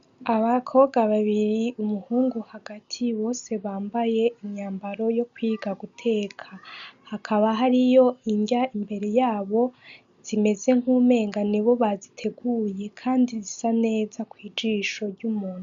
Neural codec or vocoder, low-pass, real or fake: none; 7.2 kHz; real